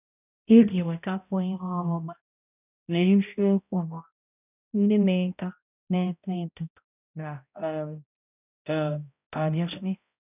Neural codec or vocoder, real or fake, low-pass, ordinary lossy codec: codec, 16 kHz, 0.5 kbps, X-Codec, HuBERT features, trained on balanced general audio; fake; 3.6 kHz; none